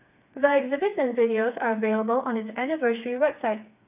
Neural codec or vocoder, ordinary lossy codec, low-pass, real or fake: codec, 16 kHz, 4 kbps, FreqCodec, smaller model; none; 3.6 kHz; fake